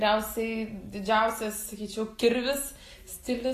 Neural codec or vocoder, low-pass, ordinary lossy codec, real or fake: none; 14.4 kHz; AAC, 48 kbps; real